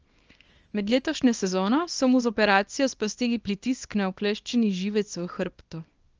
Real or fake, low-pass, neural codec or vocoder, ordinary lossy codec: fake; 7.2 kHz; codec, 24 kHz, 0.9 kbps, WavTokenizer, small release; Opus, 24 kbps